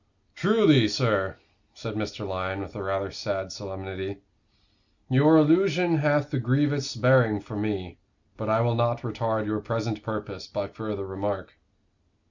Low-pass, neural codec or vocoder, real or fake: 7.2 kHz; none; real